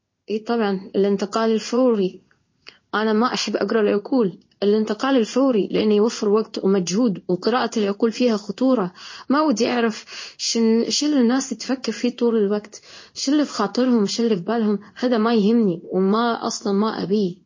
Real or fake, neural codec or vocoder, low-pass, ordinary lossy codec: fake; codec, 16 kHz in and 24 kHz out, 1 kbps, XY-Tokenizer; 7.2 kHz; MP3, 32 kbps